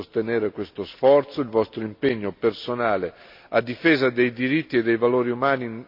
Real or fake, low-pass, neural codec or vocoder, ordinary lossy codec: real; 5.4 kHz; none; MP3, 48 kbps